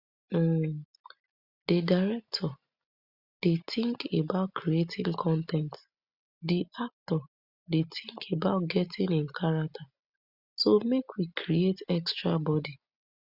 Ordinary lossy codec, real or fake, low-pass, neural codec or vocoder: Opus, 64 kbps; real; 5.4 kHz; none